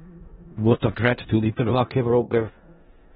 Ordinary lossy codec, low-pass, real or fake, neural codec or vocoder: AAC, 16 kbps; 10.8 kHz; fake; codec, 16 kHz in and 24 kHz out, 0.4 kbps, LongCat-Audio-Codec, four codebook decoder